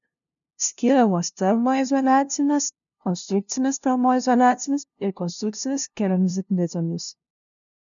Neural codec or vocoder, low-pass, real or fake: codec, 16 kHz, 0.5 kbps, FunCodec, trained on LibriTTS, 25 frames a second; 7.2 kHz; fake